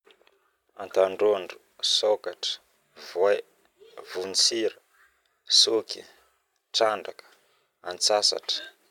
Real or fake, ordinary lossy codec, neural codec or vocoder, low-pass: real; none; none; 19.8 kHz